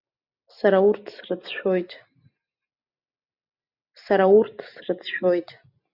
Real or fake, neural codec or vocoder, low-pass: real; none; 5.4 kHz